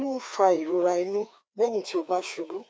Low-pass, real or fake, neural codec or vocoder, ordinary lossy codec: none; fake; codec, 16 kHz, 2 kbps, FreqCodec, larger model; none